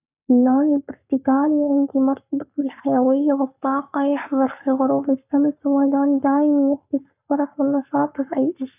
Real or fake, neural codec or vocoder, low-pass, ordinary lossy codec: fake; codec, 44.1 kHz, 7.8 kbps, Pupu-Codec; 3.6 kHz; none